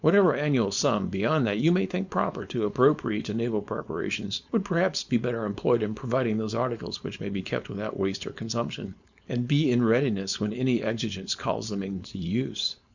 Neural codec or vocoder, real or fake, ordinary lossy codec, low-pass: codec, 16 kHz, 4.8 kbps, FACodec; fake; Opus, 64 kbps; 7.2 kHz